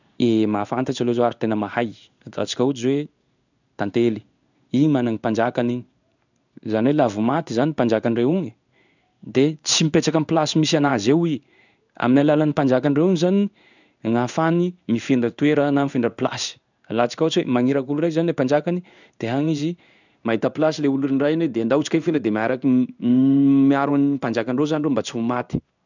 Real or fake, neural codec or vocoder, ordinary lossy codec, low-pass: fake; codec, 16 kHz in and 24 kHz out, 1 kbps, XY-Tokenizer; none; 7.2 kHz